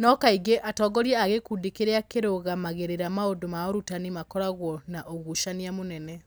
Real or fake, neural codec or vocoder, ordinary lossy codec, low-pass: real; none; none; none